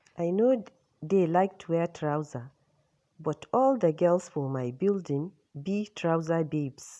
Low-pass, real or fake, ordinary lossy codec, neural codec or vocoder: none; real; none; none